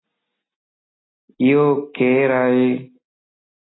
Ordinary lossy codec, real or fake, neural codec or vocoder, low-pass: AAC, 16 kbps; real; none; 7.2 kHz